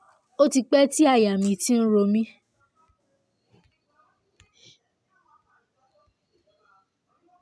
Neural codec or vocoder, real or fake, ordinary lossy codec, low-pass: none; real; none; none